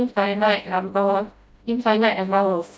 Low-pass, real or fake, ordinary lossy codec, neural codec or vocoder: none; fake; none; codec, 16 kHz, 0.5 kbps, FreqCodec, smaller model